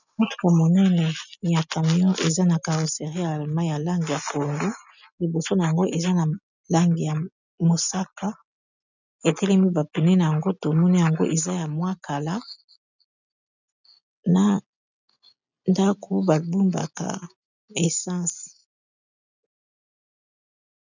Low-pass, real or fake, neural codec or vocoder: 7.2 kHz; real; none